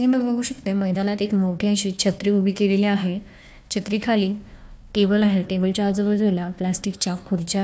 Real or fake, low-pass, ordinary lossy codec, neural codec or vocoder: fake; none; none; codec, 16 kHz, 1 kbps, FunCodec, trained on Chinese and English, 50 frames a second